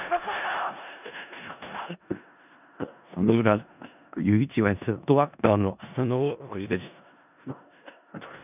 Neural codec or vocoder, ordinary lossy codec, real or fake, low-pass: codec, 16 kHz in and 24 kHz out, 0.4 kbps, LongCat-Audio-Codec, four codebook decoder; none; fake; 3.6 kHz